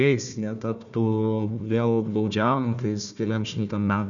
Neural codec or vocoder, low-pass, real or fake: codec, 16 kHz, 1 kbps, FunCodec, trained on Chinese and English, 50 frames a second; 7.2 kHz; fake